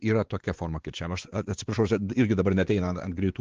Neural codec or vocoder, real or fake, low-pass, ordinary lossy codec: codec, 16 kHz, 4 kbps, X-Codec, WavLM features, trained on Multilingual LibriSpeech; fake; 7.2 kHz; Opus, 16 kbps